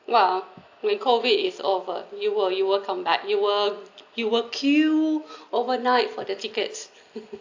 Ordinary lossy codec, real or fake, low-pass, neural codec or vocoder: AAC, 48 kbps; real; 7.2 kHz; none